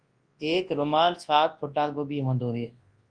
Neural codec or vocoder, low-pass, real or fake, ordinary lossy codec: codec, 24 kHz, 0.9 kbps, WavTokenizer, large speech release; 9.9 kHz; fake; Opus, 32 kbps